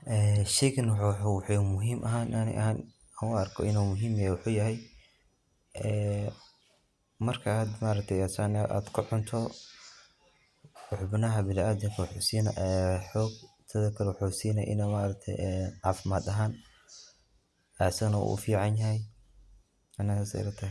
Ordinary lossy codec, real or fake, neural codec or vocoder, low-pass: none; real; none; none